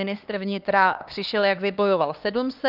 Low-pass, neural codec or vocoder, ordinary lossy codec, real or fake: 5.4 kHz; codec, 16 kHz, 2 kbps, X-Codec, HuBERT features, trained on LibriSpeech; Opus, 24 kbps; fake